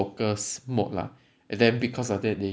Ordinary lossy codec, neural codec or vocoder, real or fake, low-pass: none; none; real; none